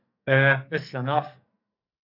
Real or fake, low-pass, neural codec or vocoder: fake; 5.4 kHz; codec, 44.1 kHz, 2.6 kbps, SNAC